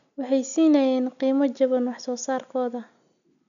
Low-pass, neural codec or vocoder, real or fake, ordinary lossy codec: 7.2 kHz; none; real; none